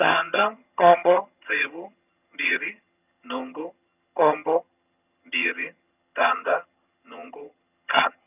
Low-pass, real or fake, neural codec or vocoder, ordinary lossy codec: 3.6 kHz; fake; vocoder, 22.05 kHz, 80 mel bands, HiFi-GAN; none